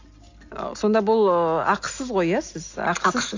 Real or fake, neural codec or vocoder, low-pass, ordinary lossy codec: real; none; 7.2 kHz; AAC, 48 kbps